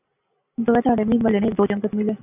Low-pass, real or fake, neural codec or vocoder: 3.6 kHz; real; none